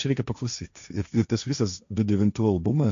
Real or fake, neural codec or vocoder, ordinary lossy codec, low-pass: fake; codec, 16 kHz, 1.1 kbps, Voila-Tokenizer; MP3, 64 kbps; 7.2 kHz